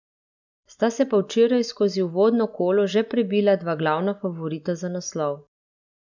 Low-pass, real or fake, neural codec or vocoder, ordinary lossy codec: 7.2 kHz; real; none; none